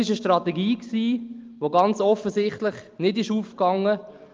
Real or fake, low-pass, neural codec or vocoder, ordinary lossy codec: real; 7.2 kHz; none; Opus, 32 kbps